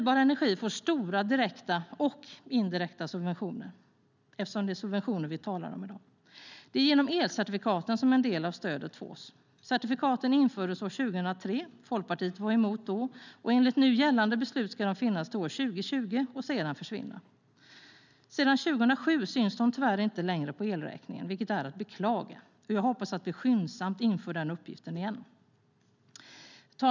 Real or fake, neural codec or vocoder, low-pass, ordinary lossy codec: real; none; 7.2 kHz; none